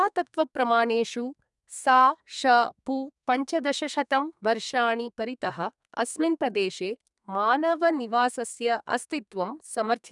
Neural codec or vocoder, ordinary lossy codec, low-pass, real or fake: codec, 32 kHz, 1.9 kbps, SNAC; none; 10.8 kHz; fake